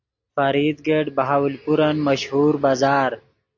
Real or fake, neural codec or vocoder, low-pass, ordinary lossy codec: real; none; 7.2 kHz; AAC, 48 kbps